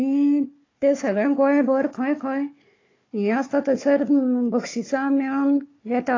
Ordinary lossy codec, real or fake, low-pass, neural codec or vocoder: AAC, 32 kbps; fake; 7.2 kHz; codec, 16 kHz, 4 kbps, FunCodec, trained on Chinese and English, 50 frames a second